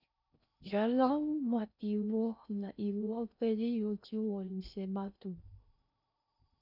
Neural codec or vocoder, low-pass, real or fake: codec, 16 kHz in and 24 kHz out, 0.6 kbps, FocalCodec, streaming, 2048 codes; 5.4 kHz; fake